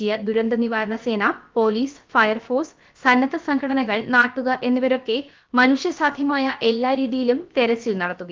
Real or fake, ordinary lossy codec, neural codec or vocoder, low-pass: fake; Opus, 24 kbps; codec, 16 kHz, about 1 kbps, DyCAST, with the encoder's durations; 7.2 kHz